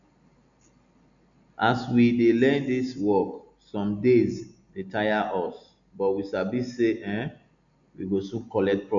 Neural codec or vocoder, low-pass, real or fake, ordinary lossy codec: none; 7.2 kHz; real; none